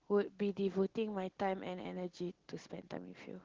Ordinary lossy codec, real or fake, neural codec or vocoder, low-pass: Opus, 16 kbps; real; none; 7.2 kHz